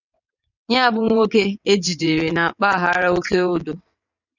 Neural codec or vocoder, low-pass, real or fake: vocoder, 22.05 kHz, 80 mel bands, WaveNeXt; 7.2 kHz; fake